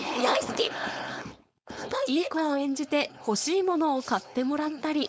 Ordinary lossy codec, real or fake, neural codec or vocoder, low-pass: none; fake; codec, 16 kHz, 4.8 kbps, FACodec; none